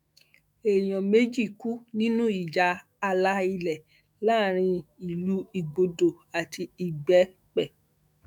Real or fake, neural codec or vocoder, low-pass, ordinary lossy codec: fake; autoencoder, 48 kHz, 128 numbers a frame, DAC-VAE, trained on Japanese speech; 19.8 kHz; none